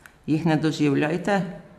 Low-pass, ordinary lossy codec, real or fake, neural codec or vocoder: 14.4 kHz; none; fake; vocoder, 44.1 kHz, 128 mel bands every 256 samples, BigVGAN v2